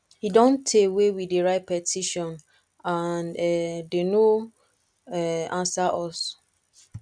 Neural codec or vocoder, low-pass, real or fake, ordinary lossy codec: none; 9.9 kHz; real; none